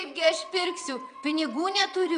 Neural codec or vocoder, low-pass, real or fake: vocoder, 22.05 kHz, 80 mel bands, WaveNeXt; 9.9 kHz; fake